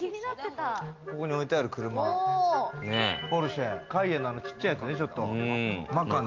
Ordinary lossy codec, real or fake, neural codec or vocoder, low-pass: Opus, 32 kbps; real; none; 7.2 kHz